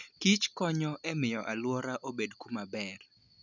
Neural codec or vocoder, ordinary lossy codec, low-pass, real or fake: none; none; 7.2 kHz; real